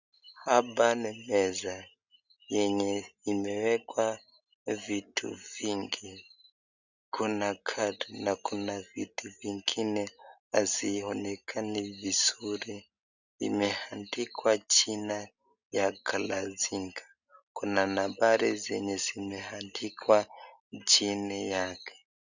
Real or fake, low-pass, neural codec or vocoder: real; 7.2 kHz; none